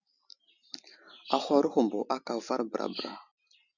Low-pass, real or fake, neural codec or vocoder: 7.2 kHz; real; none